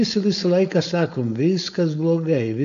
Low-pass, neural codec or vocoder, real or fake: 7.2 kHz; codec, 16 kHz, 4.8 kbps, FACodec; fake